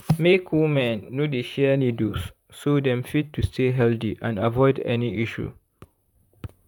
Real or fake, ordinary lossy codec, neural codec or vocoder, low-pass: fake; none; vocoder, 44.1 kHz, 128 mel bands, Pupu-Vocoder; 19.8 kHz